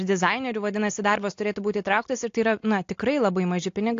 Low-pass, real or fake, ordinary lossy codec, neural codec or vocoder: 7.2 kHz; real; AAC, 48 kbps; none